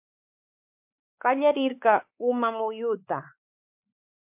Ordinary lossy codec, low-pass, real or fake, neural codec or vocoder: MP3, 32 kbps; 3.6 kHz; fake; codec, 16 kHz, 4 kbps, X-Codec, WavLM features, trained on Multilingual LibriSpeech